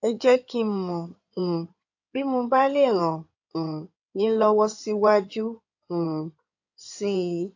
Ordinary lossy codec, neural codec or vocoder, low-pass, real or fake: AAC, 48 kbps; codec, 16 kHz in and 24 kHz out, 2.2 kbps, FireRedTTS-2 codec; 7.2 kHz; fake